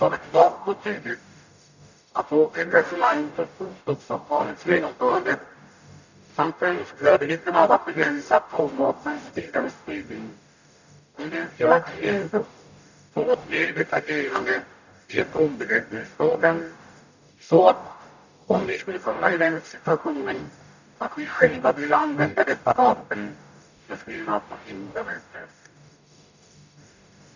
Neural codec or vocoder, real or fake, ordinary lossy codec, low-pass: codec, 44.1 kHz, 0.9 kbps, DAC; fake; none; 7.2 kHz